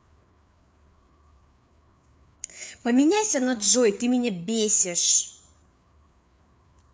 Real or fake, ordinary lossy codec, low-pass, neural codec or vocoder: fake; none; none; codec, 16 kHz, 4 kbps, FreqCodec, larger model